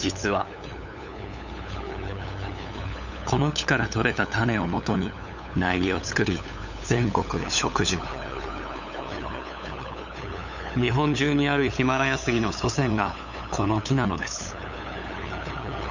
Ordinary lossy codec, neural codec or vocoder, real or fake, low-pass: none; codec, 16 kHz, 8 kbps, FunCodec, trained on LibriTTS, 25 frames a second; fake; 7.2 kHz